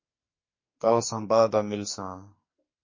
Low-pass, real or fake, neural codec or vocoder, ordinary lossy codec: 7.2 kHz; fake; codec, 44.1 kHz, 2.6 kbps, SNAC; MP3, 32 kbps